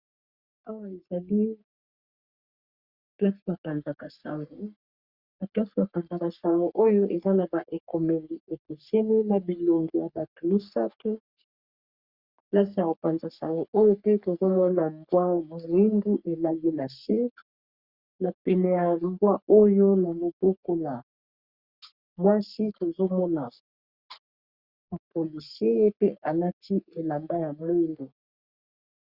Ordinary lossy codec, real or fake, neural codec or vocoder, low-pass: Opus, 64 kbps; fake; codec, 44.1 kHz, 3.4 kbps, Pupu-Codec; 5.4 kHz